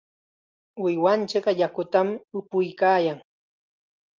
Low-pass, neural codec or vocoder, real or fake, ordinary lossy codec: 7.2 kHz; none; real; Opus, 24 kbps